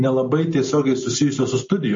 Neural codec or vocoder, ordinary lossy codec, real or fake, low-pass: none; MP3, 32 kbps; real; 7.2 kHz